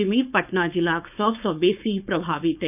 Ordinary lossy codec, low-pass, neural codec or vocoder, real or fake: none; 3.6 kHz; codec, 16 kHz, 4.8 kbps, FACodec; fake